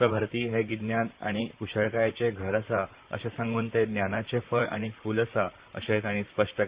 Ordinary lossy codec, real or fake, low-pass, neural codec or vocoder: Opus, 64 kbps; fake; 3.6 kHz; vocoder, 44.1 kHz, 128 mel bands, Pupu-Vocoder